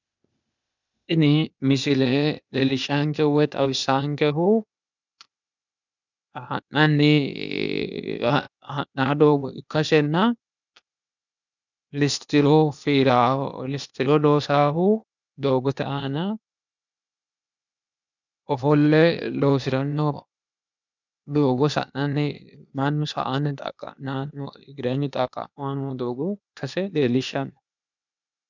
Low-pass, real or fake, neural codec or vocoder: 7.2 kHz; fake; codec, 16 kHz, 0.8 kbps, ZipCodec